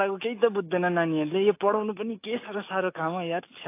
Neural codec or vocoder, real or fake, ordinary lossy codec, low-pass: autoencoder, 48 kHz, 128 numbers a frame, DAC-VAE, trained on Japanese speech; fake; AAC, 24 kbps; 3.6 kHz